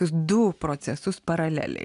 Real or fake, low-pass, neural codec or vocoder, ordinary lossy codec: real; 10.8 kHz; none; AAC, 96 kbps